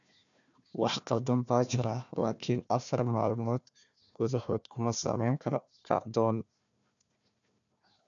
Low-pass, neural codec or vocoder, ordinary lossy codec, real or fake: 7.2 kHz; codec, 16 kHz, 1 kbps, FunCodec, trained on Chinese and English, 50 frames a second; none; fake